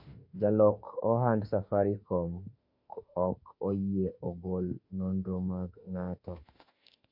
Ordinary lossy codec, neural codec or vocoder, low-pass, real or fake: MP3, 32 kbps; autoencoder, 48 kHz, 32 numbers a frame, DAC-VAE, trained on Japanese speech; 5.4 kHz; fake